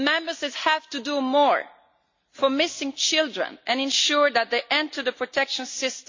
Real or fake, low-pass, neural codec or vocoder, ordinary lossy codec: real; 7.2 kHz; none; MP3, 48 kbps